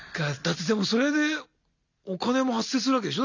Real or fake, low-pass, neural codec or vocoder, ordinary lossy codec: real; 7.2 kHz; none; none